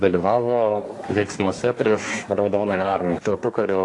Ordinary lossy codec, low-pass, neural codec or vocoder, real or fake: AAC, 48 kbps; 10.8 kHz; codec, 24 kHz, 1 kbps, SNAC; fake